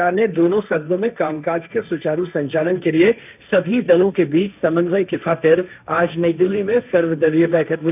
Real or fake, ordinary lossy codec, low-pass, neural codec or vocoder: fake; none; 3.6 kHz; codec, 16 kHz, 1.1 kbps, Voila-Tokenizer